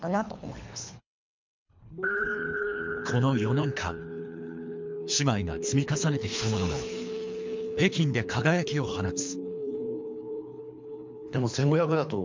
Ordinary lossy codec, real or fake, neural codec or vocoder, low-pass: MP3, 64 kbps; fake; codec, 24 kHz, 3 kbps, HILCodec; 7.2 kHz